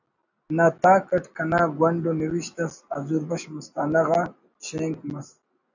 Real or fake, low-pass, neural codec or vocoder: real; 7.2 kHz; none